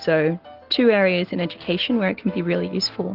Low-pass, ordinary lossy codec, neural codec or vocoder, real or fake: 5.4 kHz; Opus, 16 kbps; none; real